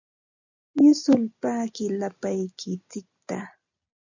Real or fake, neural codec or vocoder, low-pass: real; none; 7.2 kHz